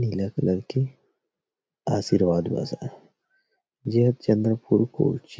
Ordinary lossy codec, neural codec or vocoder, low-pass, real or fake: none; none; none; real